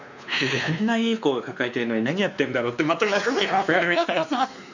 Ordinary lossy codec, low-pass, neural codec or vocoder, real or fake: none; 7.2 kHz; codec, 16 kHz, 2 kbps, X-Codec, WavLM features, trained on Multilingual LibriSpeech; fake